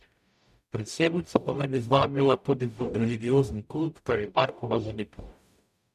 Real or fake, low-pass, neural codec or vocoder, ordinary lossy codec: fake; 14.4 kHz; codec, 44.1 kHz, 0.9 kbps, DAC; none